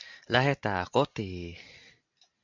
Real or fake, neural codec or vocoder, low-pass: real; none; 7.2 kHz